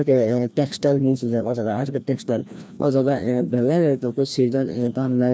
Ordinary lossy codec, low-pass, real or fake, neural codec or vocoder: none; none; fake; codec, 16 kHz, 1 kbps, FreqCodec, larger model